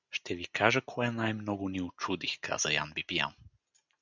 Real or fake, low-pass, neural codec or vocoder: real; 7.2 kHz; none